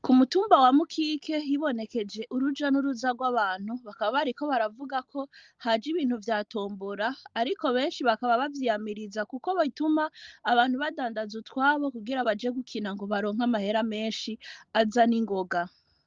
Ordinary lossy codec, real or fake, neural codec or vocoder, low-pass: Opus, 32 kbps; real; none; 7.2 kHz